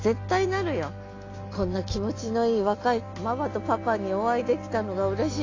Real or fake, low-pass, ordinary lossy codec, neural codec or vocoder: real; 7.2 kHz; AAC, 32 kbps; none